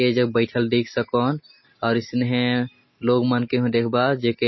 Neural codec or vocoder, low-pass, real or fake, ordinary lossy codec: none; 7.2 kHz; real; MP3, 24 kbps